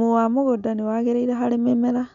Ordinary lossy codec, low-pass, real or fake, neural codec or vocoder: none; 7.2 kHz; real; none